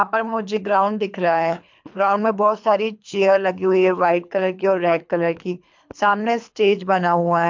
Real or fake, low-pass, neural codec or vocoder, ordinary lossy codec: fake; 7.2 kHz; codec, 24 kHz, 3 kbps, HILCodec; MP3, 64 kbps